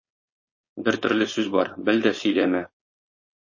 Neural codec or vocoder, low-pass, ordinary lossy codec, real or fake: codec, 16 kHz, 4.8 kbps, FACodec; 7.2 kHz; MP3, 32 kbps; fake